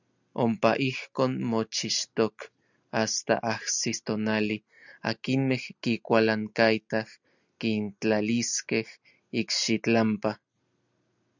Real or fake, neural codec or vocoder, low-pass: real; none; 7.2 kHz